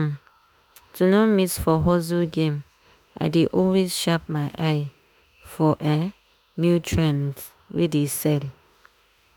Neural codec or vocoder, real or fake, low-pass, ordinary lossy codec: autoencoder, 48 kHz, 32 numbers a frame, DAC-VAE, trained on Japanese speech; fake; none; none